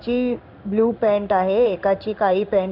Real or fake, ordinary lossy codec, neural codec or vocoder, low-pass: fake; none; codec, 16 kHz in and 24 kHz out, 1 kbps, XY-Tokenizer; 5.4 kHz